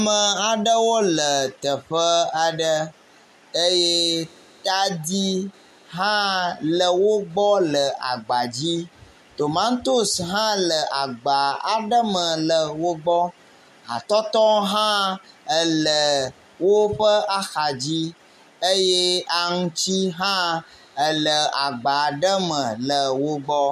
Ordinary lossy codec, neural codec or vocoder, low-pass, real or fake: MP3, 64 kbps; none; 14.4 kHz; real